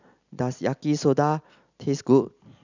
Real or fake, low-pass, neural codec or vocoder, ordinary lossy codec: real; 7.2 kHz; none; none